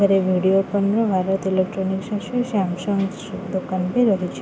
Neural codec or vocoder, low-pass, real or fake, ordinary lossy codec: none; none; real; none